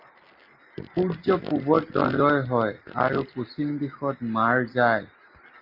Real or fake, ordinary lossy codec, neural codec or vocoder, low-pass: real; Opus, 32 kbps; none; 5.4 kHz